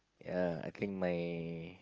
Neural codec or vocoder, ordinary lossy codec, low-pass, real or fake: none; Opus, 24 kbps; 7.2 kHz; real